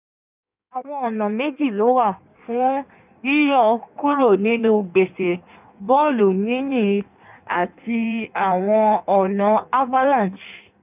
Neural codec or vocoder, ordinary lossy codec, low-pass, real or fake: codec, 16 kHz in and 24 kHz out, 1.1 kbps, FireRedTTS-2 codec; none; 3.6 kHz; fake